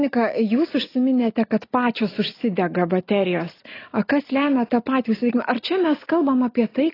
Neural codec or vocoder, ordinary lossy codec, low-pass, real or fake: none; AAC, 24 kbps; 5.4 kHz; real